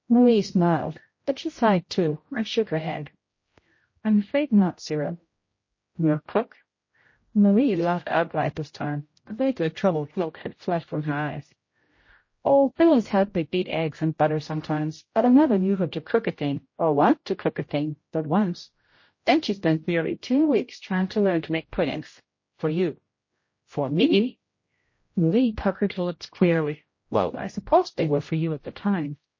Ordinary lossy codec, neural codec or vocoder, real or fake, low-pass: MP3, 32 kbps; codec, 16 kHz, 0.5 kbps, X-Codec, HuBERT features, trained on general audio; fake; 7.2 kHz